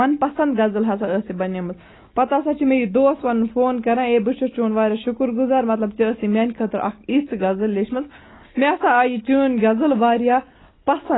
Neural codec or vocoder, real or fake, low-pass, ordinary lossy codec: none; real; 7.2 kHz; AAC, 16 kbps